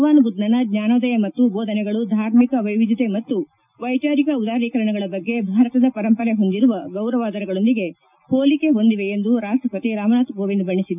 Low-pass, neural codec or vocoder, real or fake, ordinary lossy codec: 3.6 kHz; none; real; none